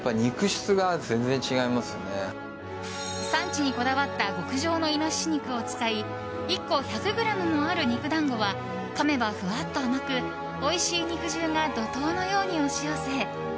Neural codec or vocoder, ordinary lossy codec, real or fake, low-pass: none; none; real; none